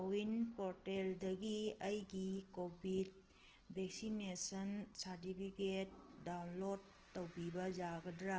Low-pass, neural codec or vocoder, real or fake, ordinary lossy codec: 7.2 kHz; none; real; Opus, 16 kbps